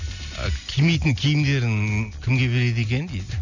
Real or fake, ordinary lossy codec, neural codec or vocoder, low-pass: real; none; none; 7.2 kHz